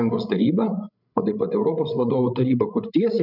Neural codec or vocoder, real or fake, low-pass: codec, 16 kHz, 16 kbps, FreqCodec, larger model; fake; 5.4 kHz